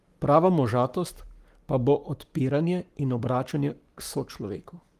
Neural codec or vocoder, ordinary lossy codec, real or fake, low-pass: codec, 44.1 kHz, 7.8 kbps, Pupu-Codec; Opus, 24 kbps; fake; 14.4 kHz